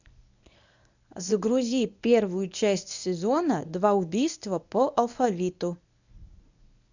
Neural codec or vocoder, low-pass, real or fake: codec, 24 kHz, 0.9 kbps, WavTokenizer, medium speech release version 1; 7.2 kHz; fake